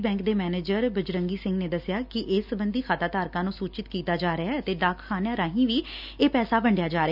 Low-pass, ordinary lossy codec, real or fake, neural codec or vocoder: 5.4 kHz; none; real; none